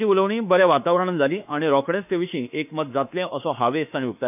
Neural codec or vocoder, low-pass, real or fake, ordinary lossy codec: autoencoder, 48 kHz, 32 numbers a frame, DAC-VAE, trained on Japanese speech; 3.6 kHz; fake; none